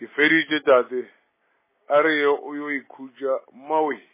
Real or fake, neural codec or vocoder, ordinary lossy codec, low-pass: real; none; MP3, 16 kbps; 3.6 kHz